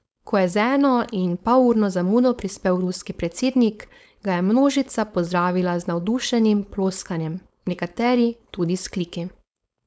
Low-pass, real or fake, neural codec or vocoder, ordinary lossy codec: none; fake; codec, 16 kHz, 4.8 kbps, FACodec; none